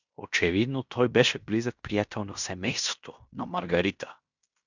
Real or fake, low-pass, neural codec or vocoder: fake; 7.2 kHz; codec, 16 kHz in and 24 kHz out, 0.9 kbps, LongCat-Audio-Codec, fine tuned four codebook decoder